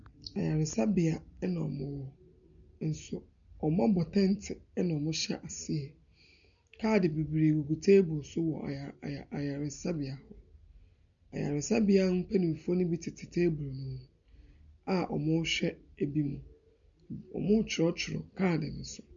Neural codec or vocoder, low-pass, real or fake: none; 7.2 kHz; real